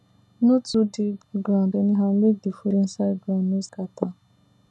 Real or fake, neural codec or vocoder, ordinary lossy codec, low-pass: real; none; none; none